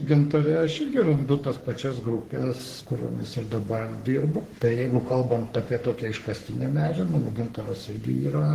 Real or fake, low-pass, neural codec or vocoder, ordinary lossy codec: fake; 14.4 kHz; codec, 44.1 kHz, 3.4 kbps, Pupu-Codec; Opus, 16 kbps